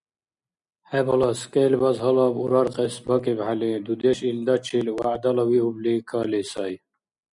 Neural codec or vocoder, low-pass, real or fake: none; 10.8 kHz; real